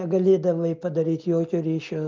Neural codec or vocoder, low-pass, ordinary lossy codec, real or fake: none; 7.2 kHz; Opus, 24 kbps; real